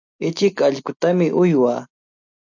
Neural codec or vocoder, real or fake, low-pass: none; real; 7.2 kHz